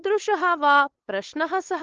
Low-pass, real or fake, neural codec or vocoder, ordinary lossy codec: 7.2 kHz; fake; codec, 16 kHz, 8 kbps, FunCodec, trained on LibriTTS, 25 frames a second; Opus, 16 kbps